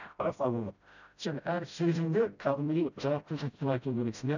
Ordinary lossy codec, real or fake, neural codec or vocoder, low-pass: none; fake; codec, 16 kHz, 0.5 kbps, FreqCodec, smaller model; 7.2 kHz